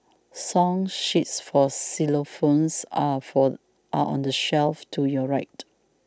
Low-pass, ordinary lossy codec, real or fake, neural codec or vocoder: none; none; real; none